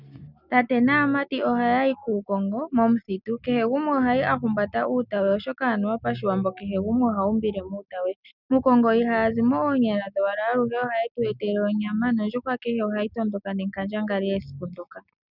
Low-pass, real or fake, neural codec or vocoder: 5.4 kHz; real; none